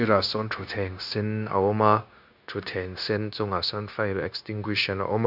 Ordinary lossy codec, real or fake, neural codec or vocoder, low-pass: none; fake; codec, 16 kHz, 0.9 kbps, LongCat-Audio-Codec; 5.4 kHz